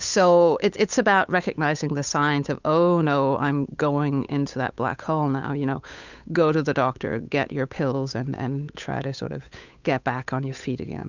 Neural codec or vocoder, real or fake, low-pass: codec, 16 kHz, 8 kbps, FunCodec, trained on Chinese and English, 25 frames a second; fake; 7.2 kHz